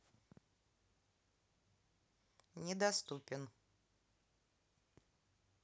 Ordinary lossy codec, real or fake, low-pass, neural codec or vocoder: none; real; none; none